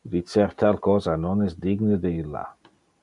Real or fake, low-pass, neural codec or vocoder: real; 10.8 kHz; none